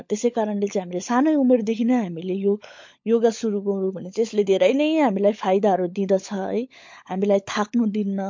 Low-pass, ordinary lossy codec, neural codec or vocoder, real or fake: 7.2 kHz; MP3, 48 kbps; codec, 16 kHz, 16 kbps, FunCodec, trained on LibriTTS, 50 frames a second; fake